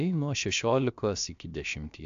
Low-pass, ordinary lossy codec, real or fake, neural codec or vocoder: 7.2 kHz; AAC, 64 kbps; fake; codec, 16 kHz, about 1 kbps, DyCAST, with the encoder's durations